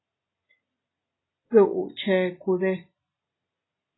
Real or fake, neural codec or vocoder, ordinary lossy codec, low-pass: real; none; AAC, 16 kbps; 7.2 kHz